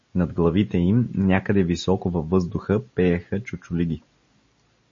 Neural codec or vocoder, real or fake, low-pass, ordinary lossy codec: none; real; 7.2 kHz; MP3, 32 kbps